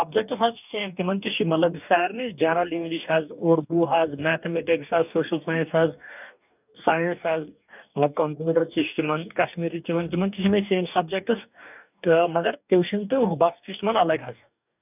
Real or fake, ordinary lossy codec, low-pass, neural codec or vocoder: fake; none; 3.6 kHz; codec, 44.1 kHz, 2.6 kbps, DAC